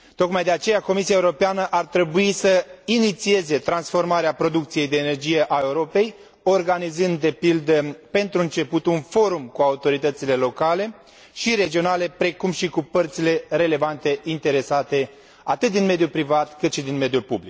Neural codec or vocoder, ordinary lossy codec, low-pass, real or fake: none; none; none; real